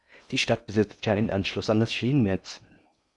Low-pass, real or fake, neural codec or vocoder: 10.8 kHz; fake; codec, 16 kHz in and 24 kHz out, 0.6 kbps, FocalCodec, streaming, 2048 codes